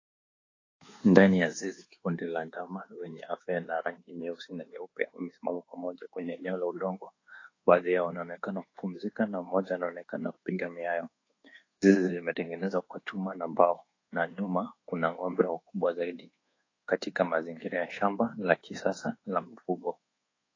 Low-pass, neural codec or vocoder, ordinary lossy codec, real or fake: 7.2 kHz; codec, 24 kHz, 1.2 kbps, DualCodec; AAC, 32 kbps; fake